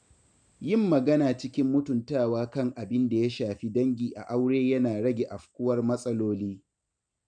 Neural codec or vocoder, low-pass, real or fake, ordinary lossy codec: none; 9.9 kHz; real; none